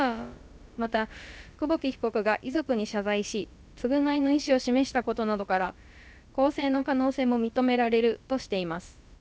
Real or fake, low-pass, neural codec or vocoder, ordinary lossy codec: fake; none; codec, 16 kHz, about 1 kbps, DyCAST, with the encoder's durations; none